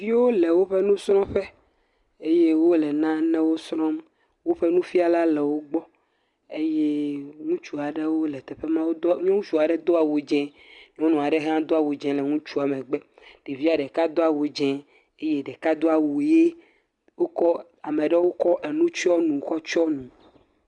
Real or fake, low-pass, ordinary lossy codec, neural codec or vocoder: real; 10.8 kHz; Opus, 64 kbps; none